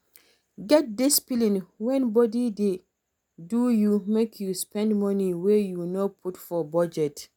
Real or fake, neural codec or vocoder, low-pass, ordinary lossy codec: real; none; none; none